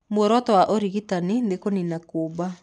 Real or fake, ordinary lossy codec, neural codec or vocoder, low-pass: real; none; none; 10.8 kHz